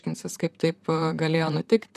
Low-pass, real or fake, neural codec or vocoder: 14.4 kHz; fake; vocoder, 44.1 kHz, 128 mel bands, Pupu-Vocoder